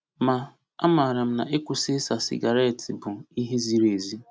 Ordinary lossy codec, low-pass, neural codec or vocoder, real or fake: none; none; none; real